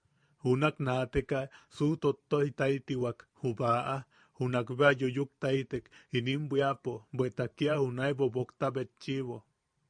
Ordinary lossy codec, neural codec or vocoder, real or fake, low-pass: MP3, 96 kbps; vocoder, 44.1 kHz, 128 mel bands every 512 samples, BigVGAN v2; fake; 9.9 kHz